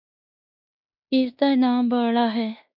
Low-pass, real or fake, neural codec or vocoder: 5.4 kHz; fake; codec, 16 kHz in and 24 kHz out, 0.9 kbps, LongCat-Audio-Codec, fine tuned four codebook decoder